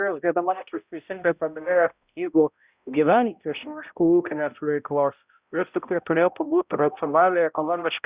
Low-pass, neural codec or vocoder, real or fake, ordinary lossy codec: 3.6 kHz; codec, 16 kHz, 0.5 kbps, X-Codec, HuBERT features, trained on balanced general audio; fake; Opus, 64 kbps